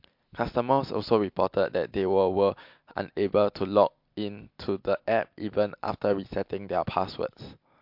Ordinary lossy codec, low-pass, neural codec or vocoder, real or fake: MP3, 48 kbps; 5.4 kHz; none; real